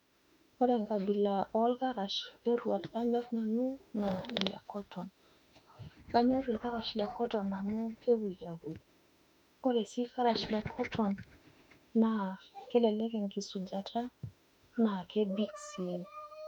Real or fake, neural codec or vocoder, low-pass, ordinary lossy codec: fake; autoencoder, 48 kHz, 32 numbers a frame, DAC-VAE, trained on Japanese speech; 19.8 kHz; none